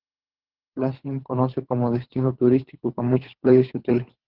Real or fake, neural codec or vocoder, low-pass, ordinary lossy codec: fake; codec, 24 kHz, 3 kbps, HILCodec; 5.4 kHz; Opus, 16 kbps